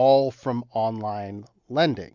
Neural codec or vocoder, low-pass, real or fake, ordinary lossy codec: none; 7.2 kHz; real; Opus, 64 kbps